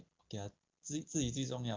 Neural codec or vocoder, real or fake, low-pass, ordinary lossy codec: none; real; 7.2 kHz; Opus, 24 kbps